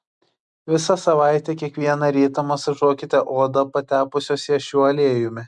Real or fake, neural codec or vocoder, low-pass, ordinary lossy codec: real; none; 10.8 kHz; MP3, 96 kbps